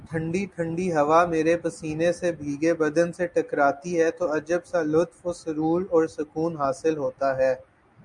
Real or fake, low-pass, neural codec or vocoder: real; 10.8 kHz; none